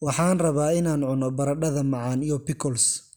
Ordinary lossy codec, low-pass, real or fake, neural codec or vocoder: none; none; real; none